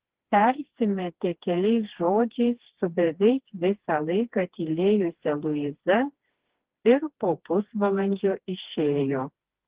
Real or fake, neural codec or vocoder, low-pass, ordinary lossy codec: fake; codec, 16 kHz, 2 kbps, FreqCodec, smaller model; 3.6 kHz; Opus, 16 kbps